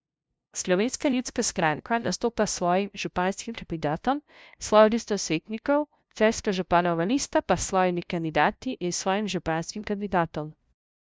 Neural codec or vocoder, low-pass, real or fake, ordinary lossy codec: codec, 16 kHz, 0.5 kbps, FunCodec, trained on LibriTTS, 25 frames a second; none; fake; none